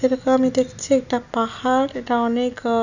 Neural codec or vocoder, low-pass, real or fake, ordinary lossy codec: none; 7.2 kHz; real; none